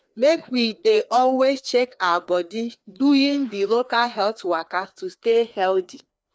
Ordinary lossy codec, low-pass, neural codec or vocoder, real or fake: none; none; codec, 16 kHz, 2 kbps, FreqCodec, larger model; fake